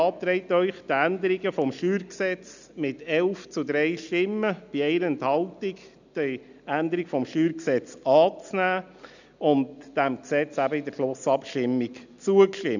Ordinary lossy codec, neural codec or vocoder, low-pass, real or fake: none; none; 7.2 kHz; real